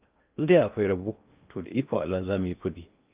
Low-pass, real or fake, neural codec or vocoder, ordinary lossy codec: 3.6 kHz; fake; codec, 16 kHz in and 24 kHz out, 0.6 kbps, FocalCodec, streaming, 2048 codes; Opus, 24 kbps